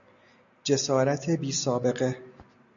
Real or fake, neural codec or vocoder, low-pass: real; none; 7.2 kHz